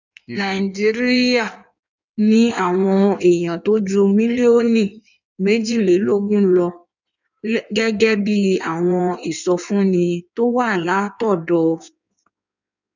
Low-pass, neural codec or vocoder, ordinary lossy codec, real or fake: 7.2 kHz; codec, 16 kHz in and 24 kHz out, 1.1 kbps, FireRedTTS-2 codec; none; fake